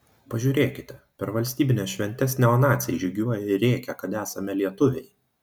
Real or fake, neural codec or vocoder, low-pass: real; none; 19.8 kHz